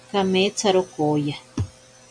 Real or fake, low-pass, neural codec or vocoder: real; 9.9 kHz; none